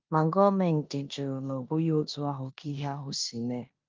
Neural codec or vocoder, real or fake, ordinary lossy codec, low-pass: codec, 16 kHz in and 24 kHz out, 0.9 kbps, LongCat-Audio-Codec, four codebook decoder; fake; Opus, 24 kbps; 7.2 kHz